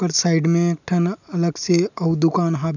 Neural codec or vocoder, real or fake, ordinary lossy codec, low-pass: none; real; none; 7.2 kHz